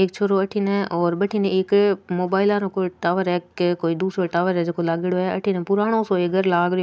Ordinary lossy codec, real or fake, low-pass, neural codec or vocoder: none; real; none; none